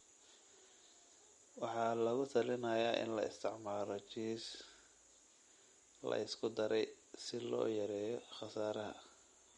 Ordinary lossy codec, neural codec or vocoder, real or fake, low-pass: MP3, 48 kbps; none; real; 14.4 kHz